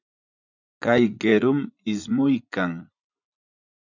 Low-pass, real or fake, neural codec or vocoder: 7.2 kHz; fake; vocoder, 44.1 kHz, 80 mel bands, Vocos